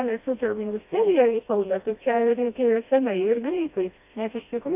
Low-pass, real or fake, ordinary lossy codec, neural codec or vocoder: 3.6 kHz; fake; AAC, 32 kbps; codec, 16 kHz, 1 kbps, FreqCodec, smaller model